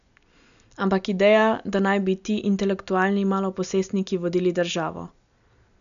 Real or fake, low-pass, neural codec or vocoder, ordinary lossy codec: real; 7.2 kHz; none; none